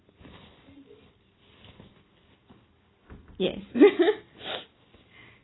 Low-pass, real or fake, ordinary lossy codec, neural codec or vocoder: 7.2 kHz; real; AAC, 16 kbps; none